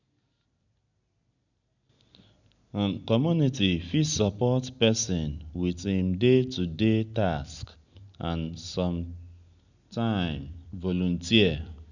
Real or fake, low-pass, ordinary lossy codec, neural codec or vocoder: real; 7.2 kHz; none; none